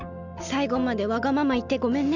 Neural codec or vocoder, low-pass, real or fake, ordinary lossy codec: none; 7.2 kHz; real; none